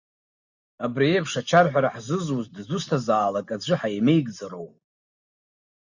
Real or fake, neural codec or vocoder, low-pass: real; none; 7.2 kHz